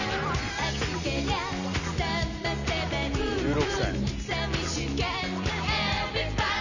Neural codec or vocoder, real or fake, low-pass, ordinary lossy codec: none; real; 7.2 kHz; none